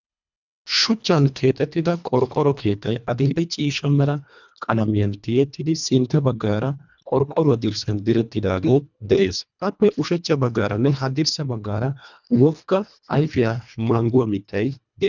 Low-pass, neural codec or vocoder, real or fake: 7.2 kHz; codec, 24 kHz, 1.5 kbps, HILCodec; fake